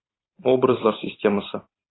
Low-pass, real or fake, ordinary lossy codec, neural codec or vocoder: 7.2 kHz; fake; AAC, 16 kbps; vocoder, 44.1 kHz, 128 mel bands every 512 samples, BigVGAN v2